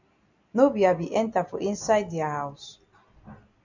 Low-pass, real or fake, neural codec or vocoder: 7.2 kHz; real; none